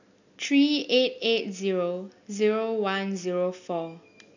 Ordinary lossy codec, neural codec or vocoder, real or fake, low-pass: none; none; real; 7.2 kHz